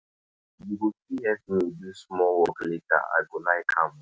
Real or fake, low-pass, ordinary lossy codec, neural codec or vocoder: real; none; none; none